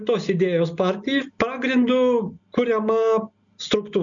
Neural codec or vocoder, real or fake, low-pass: none; real; 7.2 kHz